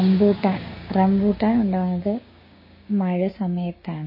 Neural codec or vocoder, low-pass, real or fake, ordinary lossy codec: codec, 16 kHz, 6 kbps, DAC; 5.4 kHz; fake; MP3, 24 kbps